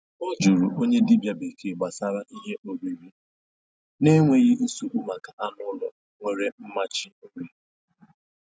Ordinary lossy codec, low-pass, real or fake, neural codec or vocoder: none; none; real; none